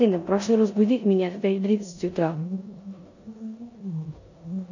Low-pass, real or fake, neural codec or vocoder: 7.2 kHz; fake; codec, 16 kHz in and 24 kHz out, 0.9 kbps, LongCat-Audio-Codec, four codebook decoder